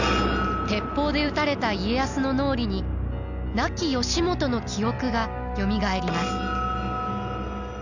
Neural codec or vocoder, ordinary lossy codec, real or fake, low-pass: none; none; real; 7.2 kHz